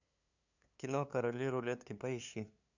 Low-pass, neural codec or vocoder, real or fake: 7.2 kHz; codec, 16 kHz, 2 kbps, FunCodec, trained on LibriTTS, 25 frames a second; fake